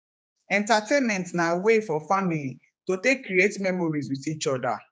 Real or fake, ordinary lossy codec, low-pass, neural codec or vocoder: fake; none; none; codec, 16 kHz, 4 kbps, X-Codec, HuBERT features, trained on general audio